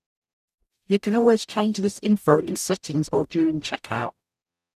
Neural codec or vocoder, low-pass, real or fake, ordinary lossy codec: codec, 44.1 kHz, 0.9 kbps, DAC; 14.4 kHz; fake; none